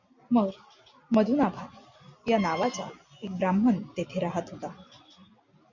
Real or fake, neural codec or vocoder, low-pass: real; none; 7.2 kHz